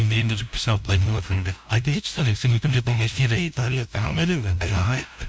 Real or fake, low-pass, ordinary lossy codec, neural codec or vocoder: fake; none; none; codec, 16 kHz, 0.5 kbps, FunCodec, trained on LibriTTS, 25 frames a second